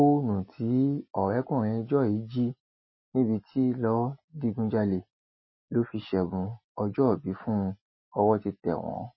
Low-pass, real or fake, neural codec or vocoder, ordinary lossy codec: 7.2 kHz; real; none; MP3, 24 kbps